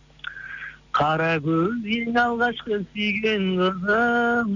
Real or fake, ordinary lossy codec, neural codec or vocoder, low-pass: real; none; none; 7.2 kHz